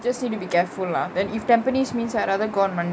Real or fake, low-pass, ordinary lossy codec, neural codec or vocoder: real; none; none; none